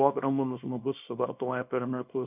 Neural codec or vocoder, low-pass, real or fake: codec, 24 kHz, 0.9 kbps, WavTokenizer, small release; 3.6 kHz; fake